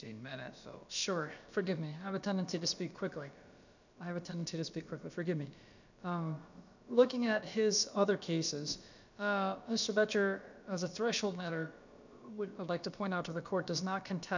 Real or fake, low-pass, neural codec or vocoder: fake; 7.2 kHz; codec, 16 kHz, about 1 kbps, DyCAST, with the encoder's durations